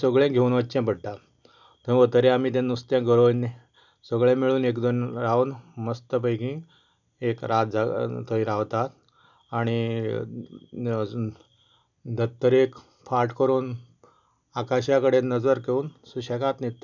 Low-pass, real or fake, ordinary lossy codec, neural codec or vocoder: 7.2 kHz; real; none; none